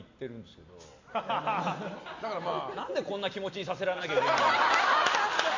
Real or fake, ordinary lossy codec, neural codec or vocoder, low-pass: real; MP3, 64 kbps; none; 7.2 kHz